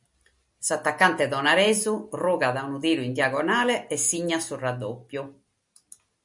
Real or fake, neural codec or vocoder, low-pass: real; none; 10.8 kHz